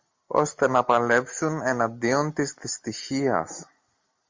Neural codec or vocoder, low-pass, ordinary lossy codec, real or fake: none; 7.2 kHz; MP3, 48 kbps; real